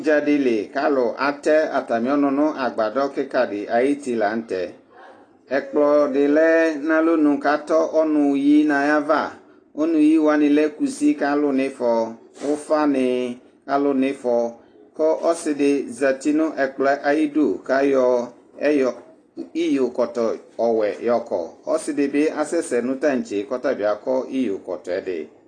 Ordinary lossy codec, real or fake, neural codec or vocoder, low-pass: AAC, 32 kbps; real; none; 9.9 kHz